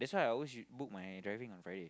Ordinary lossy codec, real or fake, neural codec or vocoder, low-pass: none; real; none; none